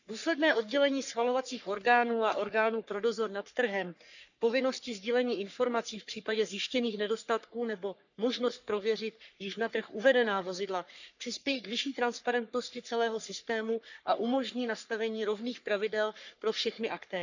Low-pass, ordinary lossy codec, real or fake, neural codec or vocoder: 7.2 kHz; none; fake; codec, 44.1 kHz, 3.4 kbps, Pupu-Codec